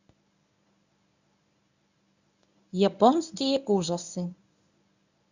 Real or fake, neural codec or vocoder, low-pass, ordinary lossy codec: fake; codec, 24 kHz, 0.9 kbps, WavTokenizer, medium speech release version 1; 7.2 kHz; none